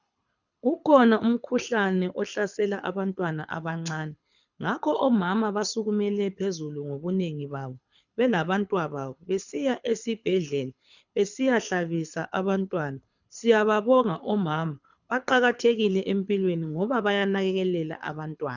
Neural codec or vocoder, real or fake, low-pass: codec, 24 kHz, 6 kbps, HILCodec; fake; 7.2 kHz